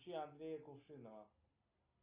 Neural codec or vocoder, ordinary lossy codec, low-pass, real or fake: none; Opus, 64 kbps; 3.6 kHz; real